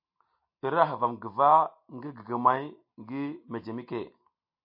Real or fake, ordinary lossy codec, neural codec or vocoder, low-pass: real; MP3, 32 kbps; none; 5.4 kHz